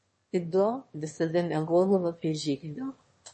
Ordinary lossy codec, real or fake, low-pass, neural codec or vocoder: MP3, 32 kbps; fake; 9.9 kHz; autoencoder, 22.05 kHz, a latent of 192 numbers a frame, VITS, trained on one speaker